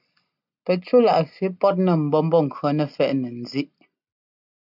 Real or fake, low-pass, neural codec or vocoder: real; 5.4 kHz; none